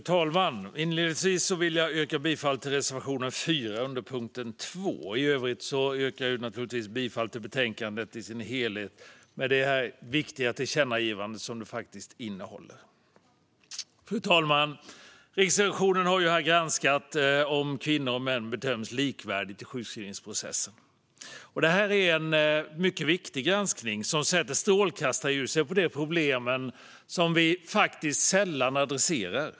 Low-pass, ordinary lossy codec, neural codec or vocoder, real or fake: none; none; none; real